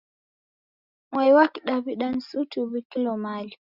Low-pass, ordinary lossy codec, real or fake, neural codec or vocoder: 5.4 kHz; Opus, 64 kbps; real; none